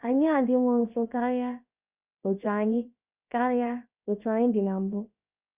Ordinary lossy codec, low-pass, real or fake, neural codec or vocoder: Opus, 64 kbps; 3.6 kHz; fake; codec, 16 kHz, about 1 kbps, DyCAST, with the encoder's durations